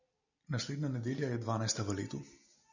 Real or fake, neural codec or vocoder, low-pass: real; none; 7.2 kHz